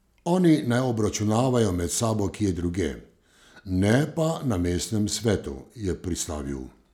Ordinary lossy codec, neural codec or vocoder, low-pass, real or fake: none; none; 19.8 kHz; real